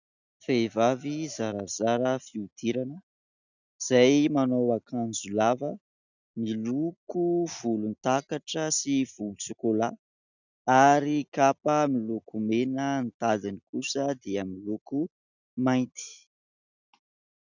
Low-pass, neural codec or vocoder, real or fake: 7.2 kHz; none; real